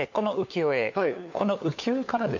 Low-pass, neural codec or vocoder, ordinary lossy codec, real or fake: 7.2 kHz; codec, 16 kHz, 4 kbps, FunCodec, trained on LibriTTS, 50 frames a second; MP3, 48 kbps; fake